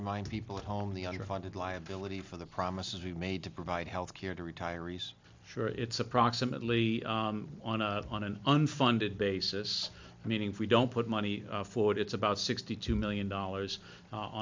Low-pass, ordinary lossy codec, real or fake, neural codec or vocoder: 7.2 kHz; MP3, 64 kbps; real; none